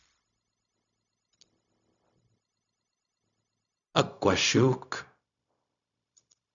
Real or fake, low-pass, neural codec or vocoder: fake; 7.2 kHz; codec, 16 kHz, 0.4 kbps, LongCat-Audio-Codec